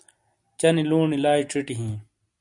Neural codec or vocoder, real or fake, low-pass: none; real; 10.8 kHz